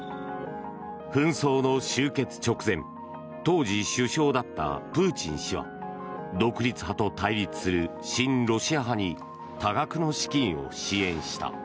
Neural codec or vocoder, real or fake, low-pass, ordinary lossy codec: none; real; none; none